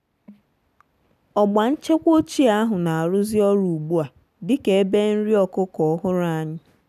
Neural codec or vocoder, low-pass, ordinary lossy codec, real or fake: none; 14.4 kHz; none; real